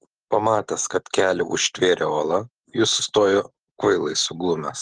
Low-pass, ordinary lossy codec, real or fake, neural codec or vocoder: 9.9 kHz; Opus, 16 kbps; real; none